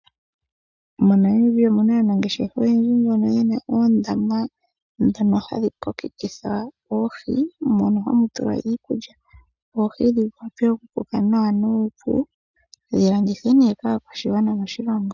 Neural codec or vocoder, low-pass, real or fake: none; 7.2 kHz; real